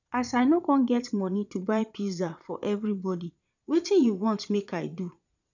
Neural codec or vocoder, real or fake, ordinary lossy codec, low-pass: vocoder, 22.05 kHz, 80 mel bands, Vocos; fake; none; 7.2 kHz